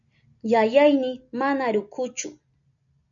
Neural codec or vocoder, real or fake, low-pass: none; real; 7.2 kHz